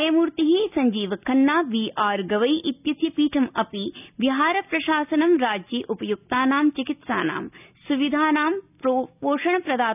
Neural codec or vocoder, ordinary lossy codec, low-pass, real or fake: none; none; 3.6 kHz; real